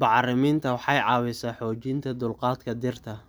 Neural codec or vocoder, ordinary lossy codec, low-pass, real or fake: none; none; none; real